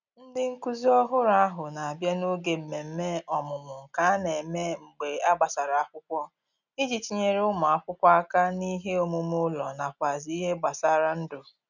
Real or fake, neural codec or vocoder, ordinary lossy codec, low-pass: real; none; none; 7.2 kHz